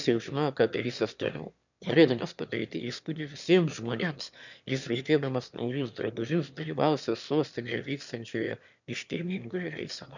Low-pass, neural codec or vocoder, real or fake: 7.2 kHz; autoencoder, 22.05 kHz, a latent of 192 numbers a frame, VITS, trained on one speaker; fake